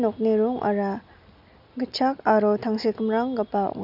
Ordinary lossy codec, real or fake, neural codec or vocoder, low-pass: none; real; none; 5.4 kHz